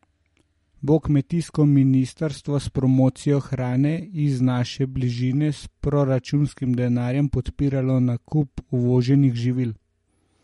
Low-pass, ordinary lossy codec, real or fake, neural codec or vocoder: 19.8 kHz; MP3, 48 kbps; real; none